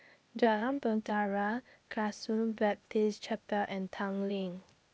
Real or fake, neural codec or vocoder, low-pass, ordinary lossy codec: fake; codec, 16 kHz, 0.8 kbps, ZipCodec; none; none